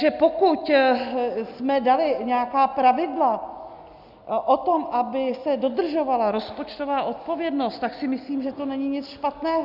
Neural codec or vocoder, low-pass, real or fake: none; 5.4 kHz; real